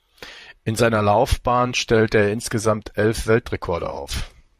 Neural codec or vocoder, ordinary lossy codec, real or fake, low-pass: vocoder, 44.1 kHz, 128 mel bands, Pupu-Vocoder; MP3, 64 kbps; fake; 14.4 kHz